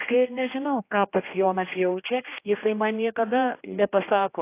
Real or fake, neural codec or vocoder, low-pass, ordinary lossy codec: fake; codec, 16 kHz, 1 kbps, X-Codec, HuBERT features, trained on general audio; 3.6 kHz; AAC, 24 kbps